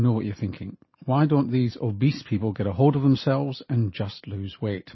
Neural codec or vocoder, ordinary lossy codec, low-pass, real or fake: none; MP3, 24 kbps; 7.2 kHz; real